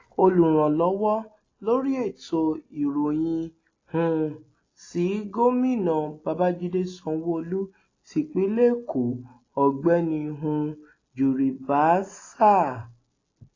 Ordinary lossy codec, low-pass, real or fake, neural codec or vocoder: AAC, 32 kbps; 7.2 kHz; real; none